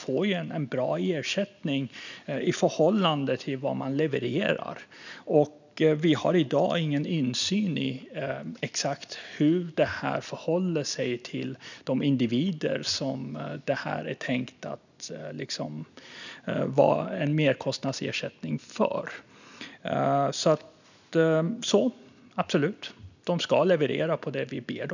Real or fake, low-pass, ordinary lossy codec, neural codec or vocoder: real; 7.2 kHz; none; none